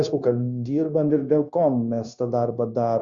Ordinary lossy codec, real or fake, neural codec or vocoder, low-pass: Opus, 64 kbps; fake; codec, 16 kHz, 0.9 kbps, LongCat-Audio-Codec; 7.2 kHz